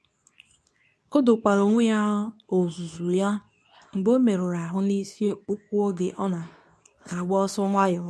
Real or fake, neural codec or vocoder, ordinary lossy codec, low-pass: fake; codec, 24 kHz, 0.9 kbps, WavTokenizer, medium speech release version 2; none; none